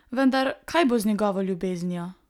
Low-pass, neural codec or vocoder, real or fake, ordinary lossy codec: 19.8 kHz; none; real; none